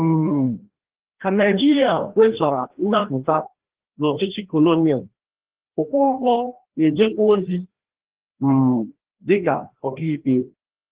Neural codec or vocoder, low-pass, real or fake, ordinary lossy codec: codec, 16 kHz, 1 kbps, FreqCodec, larger model; 3.6 kHz; fake; Opus, 16 kbps